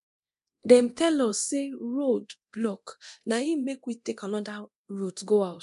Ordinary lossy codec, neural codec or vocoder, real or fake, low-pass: none; codec, 24 kHz, 0.9 kbps, DualCodec; fake; 10.8 kHz